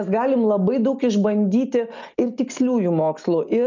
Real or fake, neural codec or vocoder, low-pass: real; none; 7.2 kHz